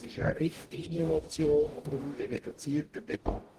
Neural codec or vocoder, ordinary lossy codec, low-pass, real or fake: codec, 44.1 kHz, 0.9 kbps, DAC; Opus, 24 kbps; 14.4 kHz; fake